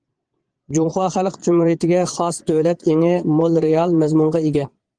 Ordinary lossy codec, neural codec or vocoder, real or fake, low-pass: Opus, 24 kbps; vocoder, 22.05 kHz, 80 mel bands, Vocos; fake; 9.9 kHz